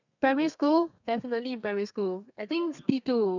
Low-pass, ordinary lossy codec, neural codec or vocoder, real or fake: 7.2 kHz; none; codec, 32 kHz, 1.9 kbps, SNAC; fake